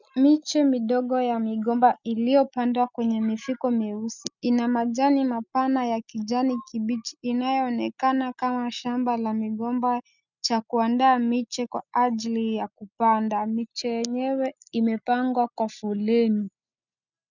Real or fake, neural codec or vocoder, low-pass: real; none; 7.2 kHz